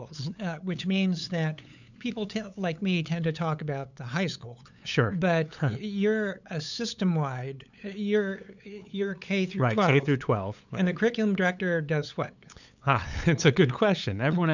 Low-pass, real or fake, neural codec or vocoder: 7.2 kHz; fake; codec, 16 kHz, 8 kbps, FunCodec, trained on LibriTTS, 25 frames a second